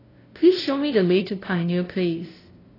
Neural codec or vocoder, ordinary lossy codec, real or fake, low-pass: codec, 16 kHz, 0.5 kbps, FunCodec, trained on LibriTTS, 25 frames a second; AAC, 24 kbps; fake; 5.4 kHz